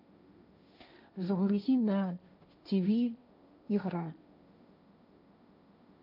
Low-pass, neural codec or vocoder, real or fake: 5.4 kHz; codec, 16 kHz, 1.1 kbps, Voila-Tokenizer; fake